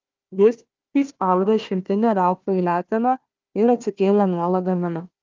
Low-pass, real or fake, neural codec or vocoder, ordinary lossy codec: 7.2 kHz; fake; codec, 16 kHz, 1 kbps, FunCodec, trained on Chinese and English, 50 frames a second; Opus, 32 kbps